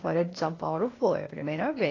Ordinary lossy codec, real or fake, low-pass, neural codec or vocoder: AAC, 32 kbps; fake; 7.2 kHz; codec, 16 kHz, 0.8 kbps, ZipCodec